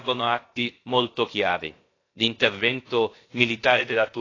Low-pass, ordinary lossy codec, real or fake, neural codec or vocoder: 7.2 kHz; AAC, 32 kbps; fake; codec, 16 kHz, about 1 kbps, DyCAST, with the encoder's durations